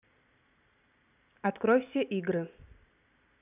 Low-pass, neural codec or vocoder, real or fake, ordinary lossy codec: 3.6 kHz; none; real; none